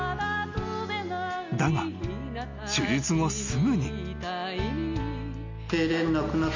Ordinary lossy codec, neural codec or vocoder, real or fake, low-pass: MP3, 64 kbps; none; real; 7.2 kHz